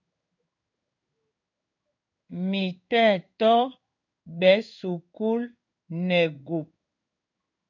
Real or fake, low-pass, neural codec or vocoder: fake; 7.2 kHz; codec, 16 kHz in and 24 kHz out, 1 kbps, XY-Tokenizer